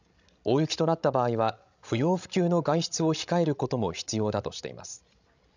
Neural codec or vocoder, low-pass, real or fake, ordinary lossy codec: codec, 16 kHz, 16 kbps, FreqCodec, larger model; 7.2 kHz; fake; none